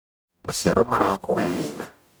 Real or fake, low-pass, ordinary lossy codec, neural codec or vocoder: fake; none; none; codec, 44.1 kHz, 0.9 kbps, DAC